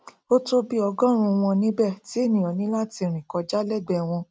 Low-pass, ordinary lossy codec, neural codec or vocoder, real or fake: none; none; none; real